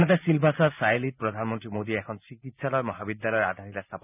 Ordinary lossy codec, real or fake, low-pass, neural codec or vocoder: none; real; 3.6 kHz; none